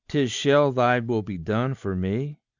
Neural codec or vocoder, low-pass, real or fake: none; 7.2 kHz; real